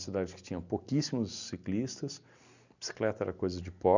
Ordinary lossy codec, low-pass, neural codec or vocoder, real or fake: none; 7.2 kHz; none; real